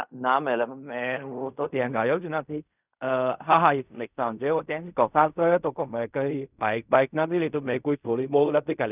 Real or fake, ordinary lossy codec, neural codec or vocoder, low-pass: fake; none; codec, 16 kHz in and 24 kHz out, 0.4 kbps, LongCat-Audio-Codec, fine tuned four codebook decoder; 3.6 kHz